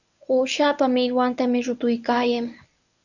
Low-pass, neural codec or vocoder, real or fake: 7.2 kHz; codec, 24 kHz, 0.9 kbps, WavTokenizer, medium speech release version 1; fake